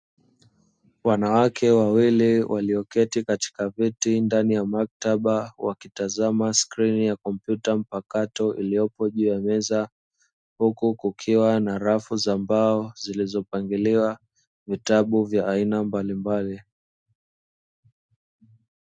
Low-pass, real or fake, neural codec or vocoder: 9.9 kHz; real; none